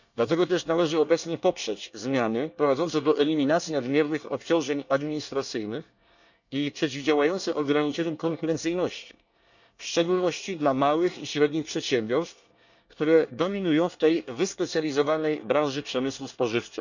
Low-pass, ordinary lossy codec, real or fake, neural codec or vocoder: 7.2 kHz; none; fake; codec, 24 kHz, 1 kbps, SNAC